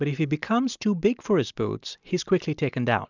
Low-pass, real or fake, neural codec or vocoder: 7.2 kHz; real; none